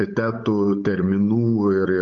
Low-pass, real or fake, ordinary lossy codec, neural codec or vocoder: 7.2 kHz; fake; AAC, 48 kbps; codec, 16 kHz, 8 kbps, FunCodec, trained on Chinese and English, 25 frames a second